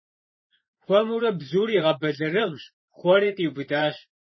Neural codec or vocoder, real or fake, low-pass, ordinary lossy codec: vocoder, 44.1 kHz, 128 mel bands every 512 samples, BigVGAN v2; fake; 7.2 kHz; MP3, 24 kbps